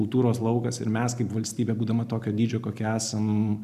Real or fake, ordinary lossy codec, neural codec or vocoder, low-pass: real; MP3, 96 kbps; none; 14.4 kHz